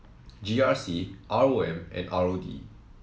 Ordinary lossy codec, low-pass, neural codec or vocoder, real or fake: none; none; none; real